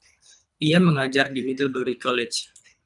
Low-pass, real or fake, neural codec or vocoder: 10.8 kHz; fake; codec, 24 kHz, 3 kbps, HILCodec